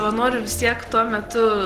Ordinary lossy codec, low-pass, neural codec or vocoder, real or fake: Opus, 16 kbps; 14.4 kHz; none; real